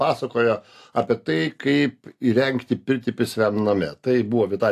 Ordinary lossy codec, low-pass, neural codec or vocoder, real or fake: AAC, 64 kbps; 14.4 kHz; none; real